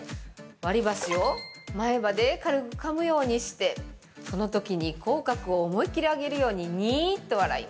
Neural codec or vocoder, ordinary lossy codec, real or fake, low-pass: none; none; real; none